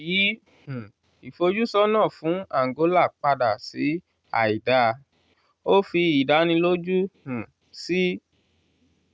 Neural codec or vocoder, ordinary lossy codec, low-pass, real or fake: none; none; none; real